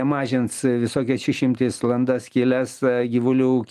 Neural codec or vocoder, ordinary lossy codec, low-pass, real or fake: none; Opus, 24 kbps; 14.4 kHz; real